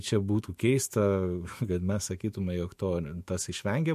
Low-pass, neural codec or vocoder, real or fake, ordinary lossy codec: 14.4 kHz; autoencoder, 48 kHz, 128 numbers a frame, DAC-VAE, trained on Japanese speech; fake; MP3, 64 kbps